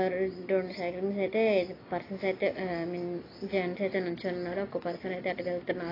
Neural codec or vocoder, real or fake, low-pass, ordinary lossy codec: none; real; 5.4 kHz; AAC, 24 kbps